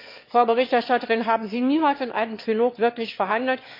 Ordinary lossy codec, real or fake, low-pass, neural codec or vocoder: MP3, 32 kbps; fake; 5.4 kHz; autoencoder, 22.05 kHz, a latent of 192 numbers a frame, VITS, trained on one speaker